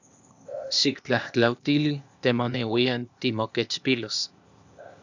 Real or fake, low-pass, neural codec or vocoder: fake; 7.2 kHz; codec, 16 kHz, 0.8 kbps, ZipCodec